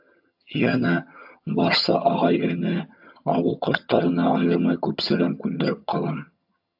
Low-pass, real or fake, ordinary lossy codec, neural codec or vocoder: 5.4 kHz; fake; AAC, 48 kbps; vocoder, 22.05 kHz, 80 mel bands, HiFi-GAN